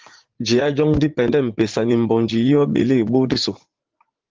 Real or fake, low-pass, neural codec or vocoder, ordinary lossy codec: fake; 7.2 kHz; vocoder, 44.1 kHz, 80 mel bands, Vocos; Opus, 16 kbps